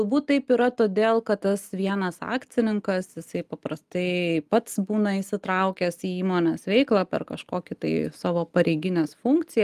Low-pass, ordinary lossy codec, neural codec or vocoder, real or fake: 14.4 kHz; Opus, 32 kbps; none; real